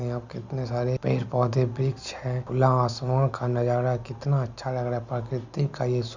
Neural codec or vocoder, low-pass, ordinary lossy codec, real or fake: none; 7.2 kHz; Opus, 64 kbps; real